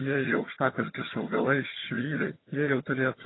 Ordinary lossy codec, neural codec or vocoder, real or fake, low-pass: AAC, 16 kbps; vocoder, 22.05 kHz, 80 mel bands, HiFi-GAN; fake; 7.2 kHz